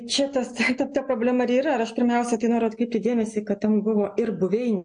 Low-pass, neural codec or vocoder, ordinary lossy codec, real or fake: 9.9 kHz; none; MP3, 48 kbps; real